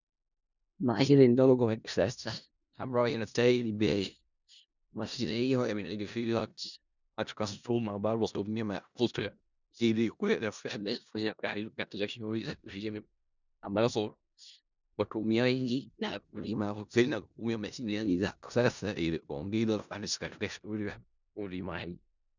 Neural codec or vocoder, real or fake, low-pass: codec, 16 kHz in and 24 kHz out, 0.4 kbps, LongCat-Audio-Codec, four codebook decoder; fake; 7.2 kHz